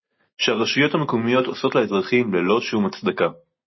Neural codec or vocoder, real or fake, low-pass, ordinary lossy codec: none; real; 7.2 kHz; MP3, 24 kbps